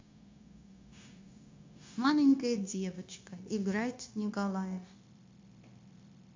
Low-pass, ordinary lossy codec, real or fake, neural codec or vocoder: 7.2 kHz; MP3, 48 kbps; fake; codec, 16 kHz, 0.9 kbps, LongCat-Audio-Codec